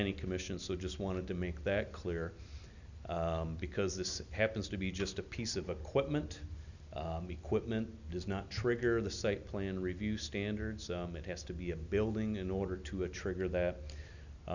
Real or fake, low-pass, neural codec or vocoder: real; 7.2 kHz; none